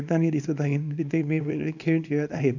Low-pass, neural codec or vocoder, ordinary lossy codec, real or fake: 7.2 kHz; codec, 24 kHz, 0.9 kbps, WavTokenizer, small release; none; fake